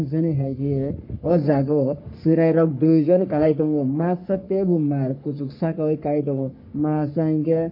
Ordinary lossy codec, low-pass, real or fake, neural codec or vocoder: MP3, 32 kbps; 5.4 kHz; fake; codec, 44.1 kHz, 3.4 kbps, Pupu-Codec